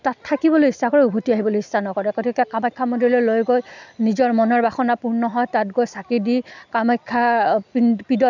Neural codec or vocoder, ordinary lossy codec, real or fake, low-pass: none; none; real; 7.2 kHz